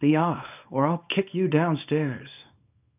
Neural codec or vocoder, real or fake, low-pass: codec, 24 kHz, 0.9 kbps, WavTokenizer, small release; fake; 3.6 kHz